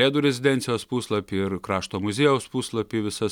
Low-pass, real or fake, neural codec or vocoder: 19.8 kHz; real; none